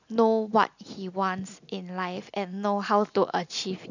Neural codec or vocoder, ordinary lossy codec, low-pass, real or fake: none; none; 7.2 kHz; real